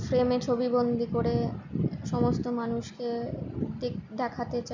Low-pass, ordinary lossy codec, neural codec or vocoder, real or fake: 7.2 kHz; none; none; real